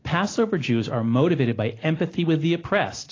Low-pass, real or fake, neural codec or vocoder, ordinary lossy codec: 7.2 kHz; real; none; AAC, 32 kbps